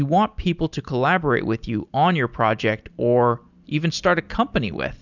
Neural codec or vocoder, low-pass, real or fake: none; 7.2 kHz; real